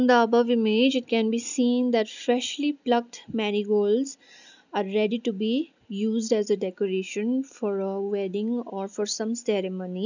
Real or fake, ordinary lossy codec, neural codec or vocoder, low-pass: real; none; none; 7.2 kHz